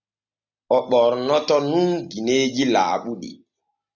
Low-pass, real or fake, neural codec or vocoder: 7.2 kHz; real; none